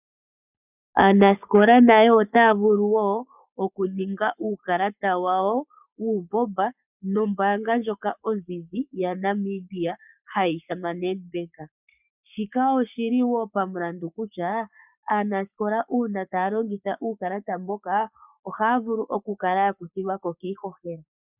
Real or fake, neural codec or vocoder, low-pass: fake; codec, 44.1 kHz, 7.8 kbps, Pupu-Codec; 3.6 kHz